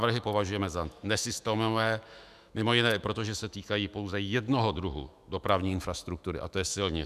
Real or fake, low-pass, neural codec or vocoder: fake; 14.4 kHz; autoencoder, 48 kHz, 128 numbers a frame, DAC-VAE, trained on Japanese speech